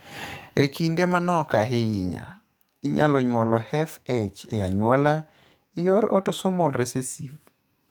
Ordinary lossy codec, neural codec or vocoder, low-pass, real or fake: none; codec, 44.1 kHz, 2.6 kbps, SNAC; none; fake